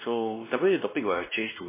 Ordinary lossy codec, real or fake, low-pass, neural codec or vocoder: MP3, 16 kbps; fake; 3.6 kHz; codec, 16 kHz, 2 kbps, X-Codec, WavLM features, trained on Multilingual LibriSpeech